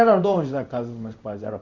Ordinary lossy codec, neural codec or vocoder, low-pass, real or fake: Opus, 64 kbps; codec, 16 kHz in and 24 kHz out, 1 kbps, XY-Tokenizer; 7.2 kHz; fake